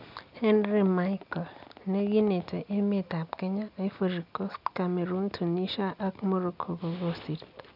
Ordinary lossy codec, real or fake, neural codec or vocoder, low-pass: none; real; none; 5.4 kHz